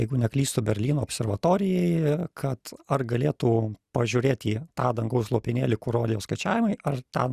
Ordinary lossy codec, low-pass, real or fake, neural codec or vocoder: Opus, 64 kbps; 14.4 kHz; real; none